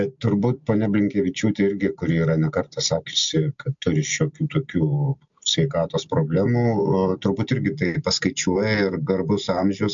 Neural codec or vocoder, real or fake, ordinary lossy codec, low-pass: none; real; MP3, 64 kbps; 7.2 kHz